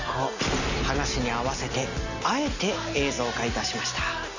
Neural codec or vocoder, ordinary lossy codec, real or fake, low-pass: none; none; real; 7.2 kHz